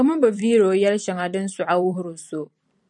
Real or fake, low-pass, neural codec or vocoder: fake; 10.8 kHz; vocoder, 44.1 kHz, 128 mel bands every 256 samples, BigVGAN v2